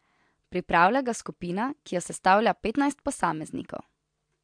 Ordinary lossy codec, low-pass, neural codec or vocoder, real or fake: MP3, 64 kbps; 9.9 kHz; none; real